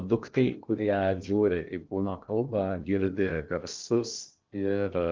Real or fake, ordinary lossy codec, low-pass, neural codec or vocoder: fake; Opus, 24 kbps; 7.2 kHz; codec, 16 kHz in and 24 kHz out, 0.8 kbps, FocalCodec, streaming, 65536 codes